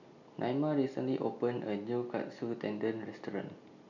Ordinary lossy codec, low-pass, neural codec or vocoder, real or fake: none; 7.2 kHz; none; real